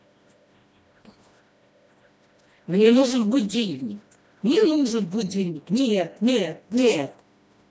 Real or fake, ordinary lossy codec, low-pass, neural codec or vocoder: fake; none; none; codec, 16 kHz, 1 kbps, FreqCodec, smaller model